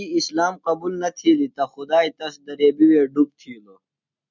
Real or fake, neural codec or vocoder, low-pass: real; none; 7.2 kHz